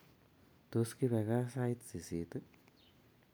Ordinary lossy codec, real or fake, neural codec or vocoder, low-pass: none; real; none; none